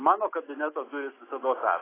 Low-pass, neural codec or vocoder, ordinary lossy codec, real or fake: 3.6 kHz; none; AAC, 16 kbps; real